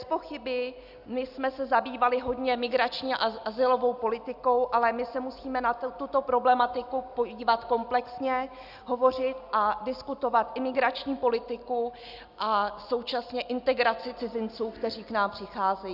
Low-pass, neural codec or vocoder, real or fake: 5.4 kHz; none; real